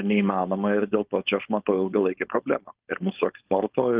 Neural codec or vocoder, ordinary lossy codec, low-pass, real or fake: codec, 16 kHz, 4.8 kbps, FACodec; Opus, 32 kbps; 3.6 kHz; fake